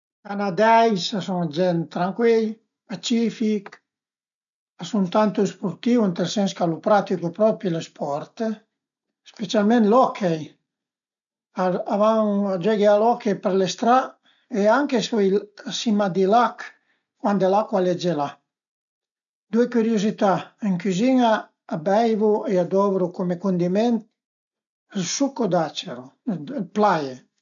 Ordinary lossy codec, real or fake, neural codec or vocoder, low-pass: none; real; none; 7.2 kHz